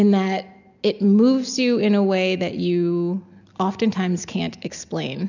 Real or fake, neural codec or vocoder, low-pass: real; none; 7.2 kHz